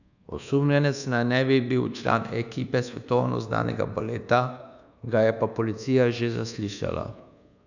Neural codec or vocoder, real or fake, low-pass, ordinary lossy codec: codec, 24 kHz, 1.2 kbps, DualCodec; fake; 7.2 kHz; none